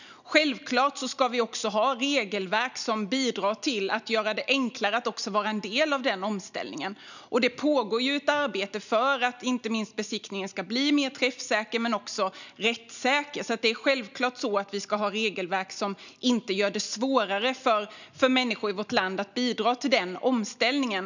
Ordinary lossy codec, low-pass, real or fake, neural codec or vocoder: none; 7.2 kHz; real; none